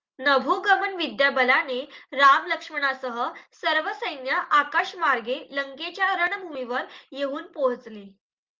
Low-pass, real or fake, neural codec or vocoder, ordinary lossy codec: 7.2 kHz; real; none; Opus, 24 kbps